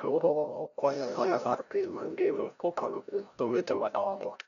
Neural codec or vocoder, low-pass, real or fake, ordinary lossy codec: codec, 16 kHz, 0.5 kbps, FreqCodec, larger model; 7.2 kHz; fake; none